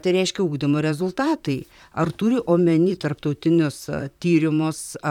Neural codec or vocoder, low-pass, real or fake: vocoder, 44.1 kHz, 128 mel bands, Pupu-Vocoder; 19.8 kHz; fake